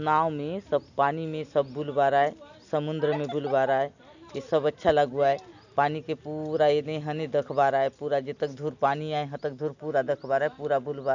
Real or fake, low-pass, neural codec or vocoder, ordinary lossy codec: real; 7.2 kHz; none; none